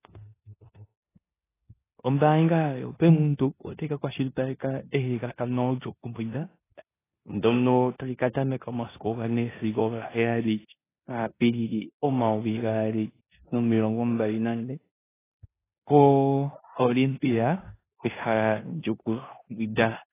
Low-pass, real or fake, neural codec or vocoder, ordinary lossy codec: 3.6 kHz; fake; codec, 16 kHz in and 24 kHz out, 0.9 kbps, LongCat-Audio-Codec, four codebook decoder; AAC, 16 kbps